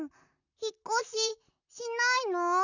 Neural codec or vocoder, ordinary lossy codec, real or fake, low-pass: vocoder, 44.1 kHz, 128 mel bands, Pupu-Vocoder; none; fake; 7.2 kHz